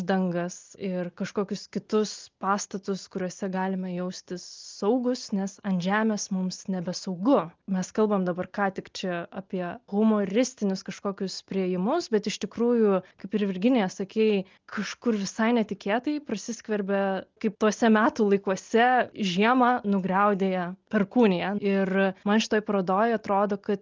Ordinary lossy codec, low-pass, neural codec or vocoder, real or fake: Opus, 24 kbps; 7.2 kHz; none; real